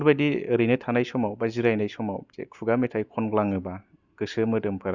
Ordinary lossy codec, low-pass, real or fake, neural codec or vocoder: Opus, 64 kbps; 7.2 kHz; real; none